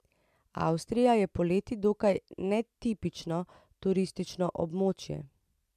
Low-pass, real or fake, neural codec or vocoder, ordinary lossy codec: 14.4 kHz; fake; vocoder, 44.1 kHz, 128 mel bands every 256 samples, BigVGAN v2; MP3, 96 kbps